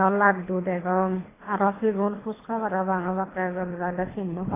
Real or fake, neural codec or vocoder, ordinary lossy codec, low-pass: fake; codec, 24 kHz, 3 kbps, HILCodec; AAC, 16 kbps; 3.6 kHz